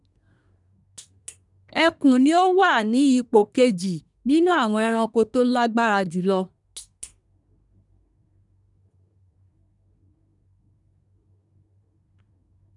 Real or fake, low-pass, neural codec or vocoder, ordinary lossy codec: fake; 10.8 kHz; codec, 24 kHz, 1 kbps, SNAC; none